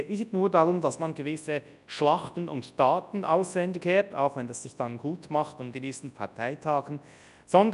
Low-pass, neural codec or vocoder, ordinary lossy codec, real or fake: 10.8 kHz; codec, 24 kHz, 0.9 kbps, WavTokenizer, large speech release; none; fake